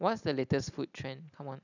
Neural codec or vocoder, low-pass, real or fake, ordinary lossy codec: none; 7.2 kHz; real; none